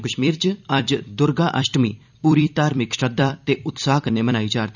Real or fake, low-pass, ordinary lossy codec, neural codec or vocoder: fake; 7.2 kHz; none; vocoder, 44.1 kHz, 128 mel bands every 256 samples, BigVGAN v2